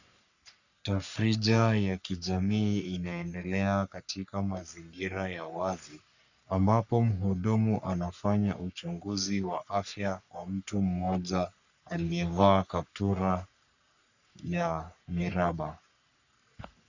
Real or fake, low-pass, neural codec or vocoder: fake; 7.2 kHz; codec, 44.1 kHz, 3.4 kbps, Pupu-Codec